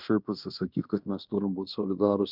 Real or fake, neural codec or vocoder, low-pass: fake; codec, 16 kHz in and 24 kHz out, 0.9 kbps, LongCat-Audio-Codec, fine tuned four codebook decoder; 5.4 kHz